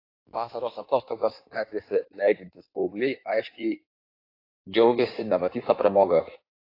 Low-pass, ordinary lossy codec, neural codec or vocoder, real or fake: 5.4 kHz; AAC, 32 kbps; codec, 16 kHz in and 24 kHz out, 1.1 kbps, FireRedTTS-2 codec; fake